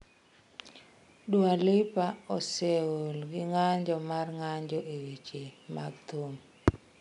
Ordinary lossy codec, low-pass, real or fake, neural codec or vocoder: MP3, 96 kbps; 10.8 kHz; real; none